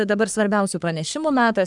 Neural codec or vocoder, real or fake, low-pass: codec, 44.1 kHz, 3.4 kbps, Pupu-Codec; fake; 10.8 kHz